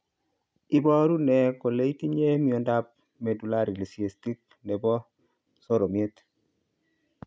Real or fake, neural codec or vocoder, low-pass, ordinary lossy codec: real; none; none; none